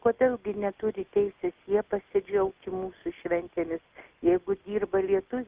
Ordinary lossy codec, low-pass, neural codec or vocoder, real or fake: Opus, 32 kbps; 3.6 kHz; none; real